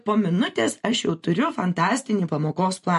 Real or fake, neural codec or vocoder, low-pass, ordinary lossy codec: real; none; 14.4 kHz; MP3, 48 kbps